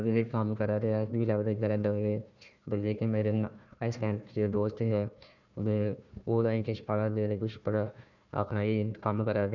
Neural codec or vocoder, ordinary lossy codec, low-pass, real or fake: codec, 16 kHz, 1 kbps, FunCodec, trained on Chinese and English, 50 frames a second; none; 7.2 kHz; fake